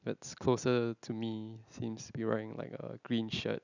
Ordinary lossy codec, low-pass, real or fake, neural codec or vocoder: none; 7.2 kHz; real; none